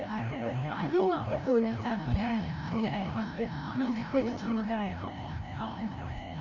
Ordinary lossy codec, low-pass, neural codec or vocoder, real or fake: none; 7.2 kHz; codec, 16 kHz, 0.5 kbps, FreqCodec, larger model; fake